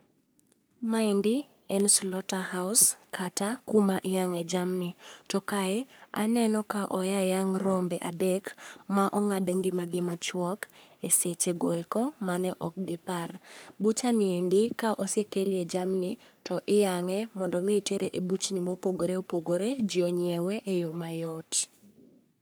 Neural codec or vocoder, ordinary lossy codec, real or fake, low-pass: codec, 44.1 kHz, 3.4 kbps, Pupu-Codec; none; fake; none